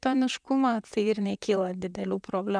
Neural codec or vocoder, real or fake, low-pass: vocoder, 44.1 kHz, 128 mel bands, Pupu-Vocoder; fake; 9.9 kHz